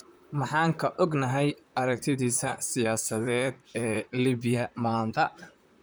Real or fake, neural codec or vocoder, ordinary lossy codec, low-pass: fake; vocoder, 44.1 kHz, 128 mel bands, Pupu-Vocoder; none; none